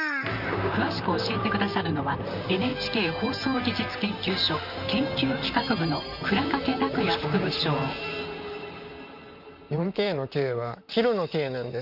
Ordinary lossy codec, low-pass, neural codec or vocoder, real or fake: none; 5.4 kHz; vocoder, 44.1 kHz, 128 mel bands, Pupu-Vocoder; fake